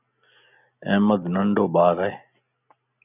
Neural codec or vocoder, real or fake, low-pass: none; real; 3.6 kHz